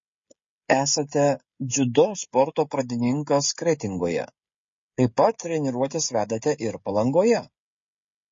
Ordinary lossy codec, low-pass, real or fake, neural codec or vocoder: MP3, 32 kbps; 7.2 kHz; fake; codec, 16 kHz, 16 kbps, FreqCodec, smaller model